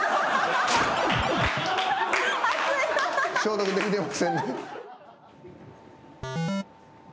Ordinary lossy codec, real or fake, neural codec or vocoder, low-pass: none; real; none; none